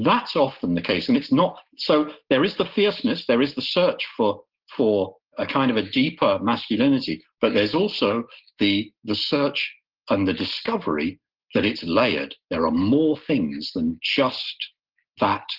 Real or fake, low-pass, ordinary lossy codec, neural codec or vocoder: real; 5.4 kHz; Opus, 16 kbps; none